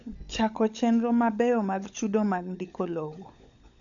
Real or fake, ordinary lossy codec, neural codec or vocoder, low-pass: fake; none; codec, 16 kHz, 16 kbps, FunCodec, trained on LibriTTS, 50 frames a second; 7.2 kHz